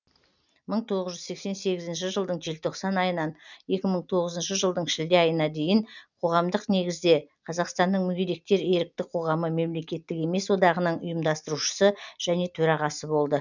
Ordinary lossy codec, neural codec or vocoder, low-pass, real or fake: none; none; 7.2 kHz; real